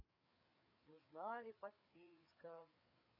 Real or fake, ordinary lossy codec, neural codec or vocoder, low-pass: fake; none; codec, 16 kHz, 4 kbps, FreqCodec, larger model; 5.4 kHz